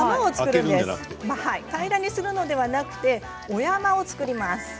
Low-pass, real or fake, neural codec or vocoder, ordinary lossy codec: none; real; none; none